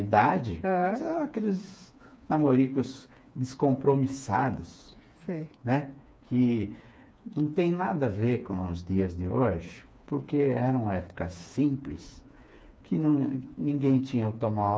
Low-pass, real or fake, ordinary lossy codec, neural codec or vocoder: none; fake; none; codec, 16 kHz, 4 kbps, FreqCodec, smaller model